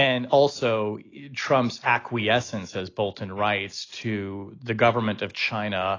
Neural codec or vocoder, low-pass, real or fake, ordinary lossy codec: none; 7.2 kHz; real; AAC, 32 kbps